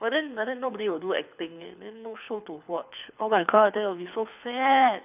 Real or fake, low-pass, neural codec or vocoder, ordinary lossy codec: fake; 3.6 kHz; codec, 24 kHz, 6 kbps, HILCodec; none